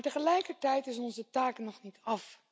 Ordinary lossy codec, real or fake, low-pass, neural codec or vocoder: none; real; none; none